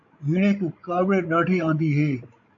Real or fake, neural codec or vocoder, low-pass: fake; codec, 16 kHz, 16 kbps, FreqCodec, larger model; 7.2 kHz